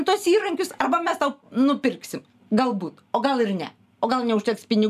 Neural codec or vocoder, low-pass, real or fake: none; 14.4 kHz; real